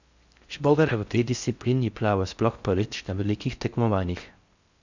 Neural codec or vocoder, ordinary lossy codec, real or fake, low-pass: codec, 16 kHz in and 24 kHz out, 0.6 kbps, FocalCodec, streaming, 4096 codes; Opus, 64 kbps; fake; 7.2 kHz